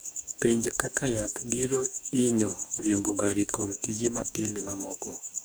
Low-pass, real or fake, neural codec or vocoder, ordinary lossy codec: none; fake; codec, 44.1 kHz, 2.6 kbps, DAC; none